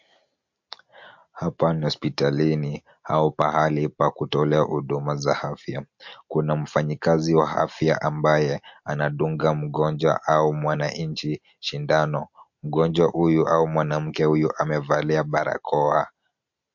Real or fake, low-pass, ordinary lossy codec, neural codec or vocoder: real; 7.2 kHz; MP3, 48 kbps; none